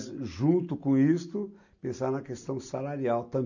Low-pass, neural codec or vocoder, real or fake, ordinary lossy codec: 7.2 kHz; none; real; none